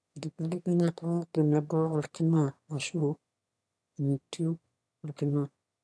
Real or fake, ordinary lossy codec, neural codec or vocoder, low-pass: fake; none; autoencoder, 22.05 kHz, a latent of 192 numbers a frame, VITS, trained on one speaker; none